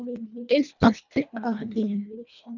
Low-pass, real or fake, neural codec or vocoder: 7.2 kHz; fake; codec, 24 kHz, 1.5 kbps, HILCodec